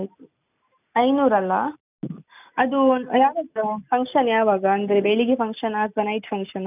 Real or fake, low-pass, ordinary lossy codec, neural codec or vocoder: real; 3.6 kHz; none; none